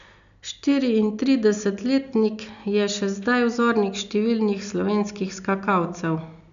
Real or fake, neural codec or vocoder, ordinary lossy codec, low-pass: real; none; none; 7.2 kHz